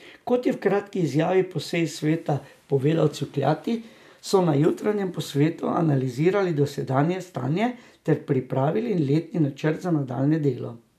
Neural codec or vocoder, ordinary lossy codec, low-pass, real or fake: none; none; 14.4 kHz; real